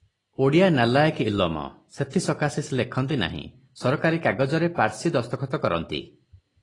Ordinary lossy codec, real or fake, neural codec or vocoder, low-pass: AAC, 32 kbps; real; none; 10.8 kHz